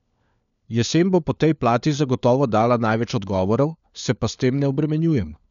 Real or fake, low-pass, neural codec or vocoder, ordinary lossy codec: fake; 7.2 kHz; codec, 16 kHz, 4 kbps, FunCodec, trained on LibriTTS, 50 frames a second; none